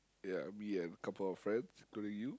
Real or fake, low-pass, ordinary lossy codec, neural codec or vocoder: real; none; none; none